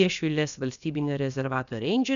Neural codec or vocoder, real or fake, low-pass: codec, 16 kHz, about 1 kbps, DyCAST, with the encoder's durations; fake; 7.2 kHz